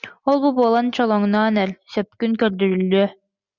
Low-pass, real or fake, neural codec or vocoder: 7.2 kHz; real; none